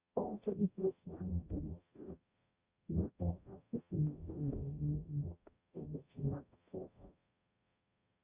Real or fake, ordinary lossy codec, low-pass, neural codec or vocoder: fake; Opus, 64 kbps; 3.6 kHz; codec, 44.1 kHz, 0.9 kbps, DAC